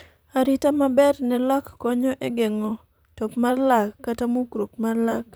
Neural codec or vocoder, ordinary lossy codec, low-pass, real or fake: vocoder, 44.1 kHz, 128 mel bands, Pupu-Vocoder; none; none; fake